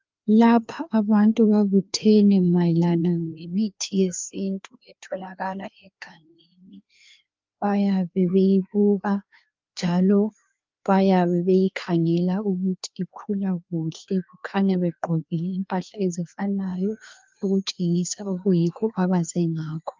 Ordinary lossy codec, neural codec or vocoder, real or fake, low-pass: Opus, 24 kbps; codec, 16 kHz, 2 kbps, FreqCodec, larger model; fake; 7.2 kHz